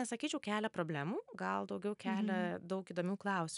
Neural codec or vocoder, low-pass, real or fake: none; 10.8 kHz; real